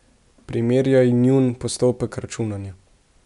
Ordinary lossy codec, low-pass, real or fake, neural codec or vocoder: none; 10.8 kHz; real; none